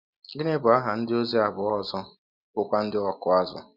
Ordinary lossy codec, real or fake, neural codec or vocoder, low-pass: none; real; none; 5.4 kHz